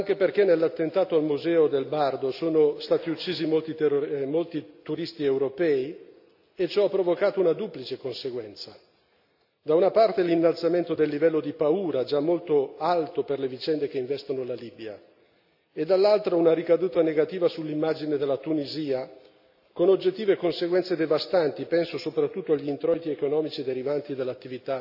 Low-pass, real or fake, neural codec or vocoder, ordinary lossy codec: 5.4 kHz; real; none; AAC, 48 kbps